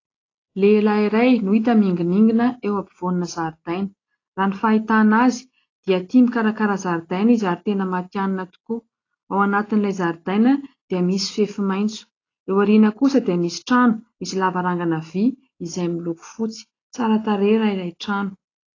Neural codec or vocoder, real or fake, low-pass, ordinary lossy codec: none; real; 7.2 kHz; AAC, 32 kbps